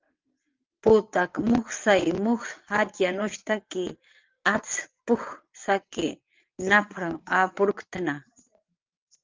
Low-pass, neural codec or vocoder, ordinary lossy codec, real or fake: 7.2 kHz; vocoder, 22.05 kHz, 80 mel bands, WaveNeXt; Opus, 24 kbps; fake